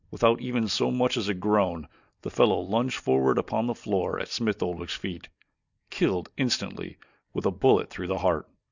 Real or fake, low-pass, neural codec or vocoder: real; 7.2 kHz; none